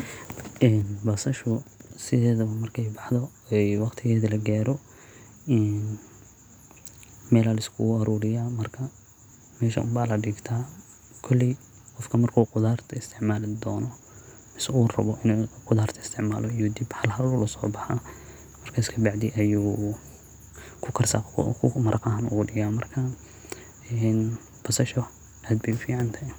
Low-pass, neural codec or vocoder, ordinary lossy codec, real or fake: none; none; none; real